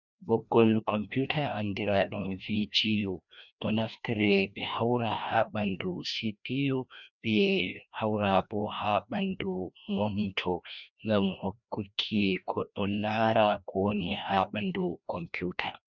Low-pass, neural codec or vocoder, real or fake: 7.2 kHz; codec, 16 kHz, 1 kbps, FreqCodec, larger model; fake